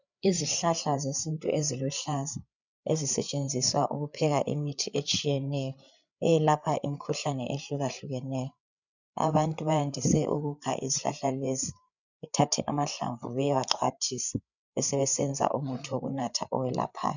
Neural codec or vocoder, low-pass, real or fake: codec, 16 kHz, 8 kbps, FreqCodec, larger model; 7.2 kHz; fake